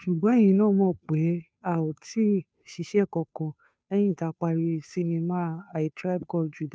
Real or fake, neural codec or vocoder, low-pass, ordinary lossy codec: fake; codec, 16 kHz, 2 kbps, FunCodec, trained on Chinese and English, 25 frames a second; none; none